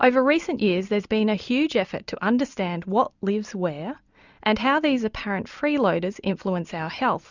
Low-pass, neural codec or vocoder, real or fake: 7.2 kHz; none; real